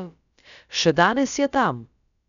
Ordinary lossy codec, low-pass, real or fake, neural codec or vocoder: none; 7.2 kHz; fake; codec, 16 kHz, about 1 kbps, DyCAST, with the encoder's durations